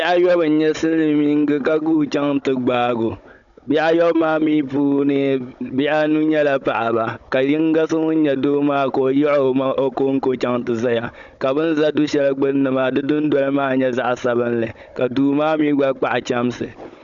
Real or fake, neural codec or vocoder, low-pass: fake; codec, 16 kHz, 16 kbps, FunCodec, trained on Chinese and English, 50 frames a second; 7.2 kHz